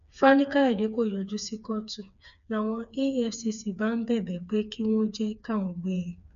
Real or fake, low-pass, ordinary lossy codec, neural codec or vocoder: fake; 7.2 kHz; none; codec, 16 kHz, 4 kbps, FreqCodec, smaller model